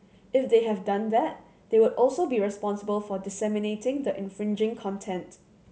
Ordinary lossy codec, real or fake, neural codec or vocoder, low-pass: none; real; none; none